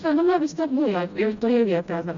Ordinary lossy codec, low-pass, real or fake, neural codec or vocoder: Opus, 64 kbps; 7.2 kHz; fake; codec, 16 kHz, 0.5 kbps, FreqCodec, smaller model